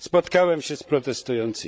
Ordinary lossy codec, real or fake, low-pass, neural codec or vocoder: none; fake; none; codec, 16 kHz, 16 kbps, FreqCodec, larger model